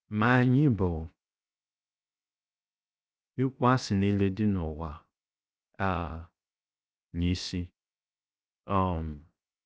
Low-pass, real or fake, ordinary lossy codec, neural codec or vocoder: none; fake; none; codec, 16 kHz, 0.7 kbps, FocalCodec